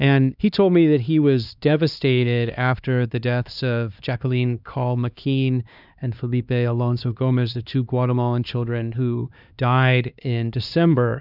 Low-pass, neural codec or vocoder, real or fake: 5.4 kHz; codec, 16 kHz, 2 kbps, X-Codec, HuBERT features, trained on LibriSpeech; fake